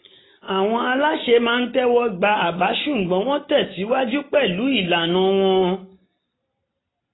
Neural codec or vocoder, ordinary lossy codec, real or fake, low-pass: none; AAC, 16 kbps; real; 7.2 kHz